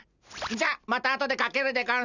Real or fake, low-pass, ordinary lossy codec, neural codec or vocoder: real; 7.2 kHz; none; none